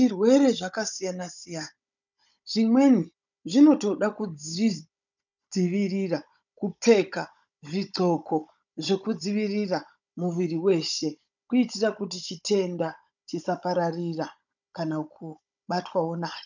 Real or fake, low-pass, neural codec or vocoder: fake; 7.2 kHz; codec, 16 kHz, 16 kbps, FunCodec, trained on Chinese and English, 50 frames a second